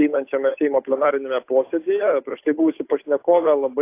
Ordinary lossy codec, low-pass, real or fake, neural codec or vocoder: AAC, 24 kbps; 3.6 kHz; fake; codec, 16 kHz, 8 kbps, FunCodec, trained on Chinese and English, 25 frames a second